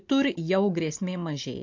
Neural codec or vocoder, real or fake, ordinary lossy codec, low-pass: none; real; MP3, 48 kbps; 7.2 kHz